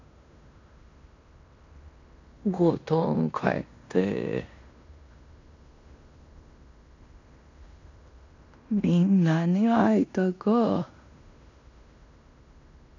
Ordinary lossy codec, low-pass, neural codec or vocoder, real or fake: none; 7.2 kHz; codec, 16 kHz in and 24 kHz out, 0.9 kbps, LongCat-Audio-Codec, fine tuned four codebook decoder; fake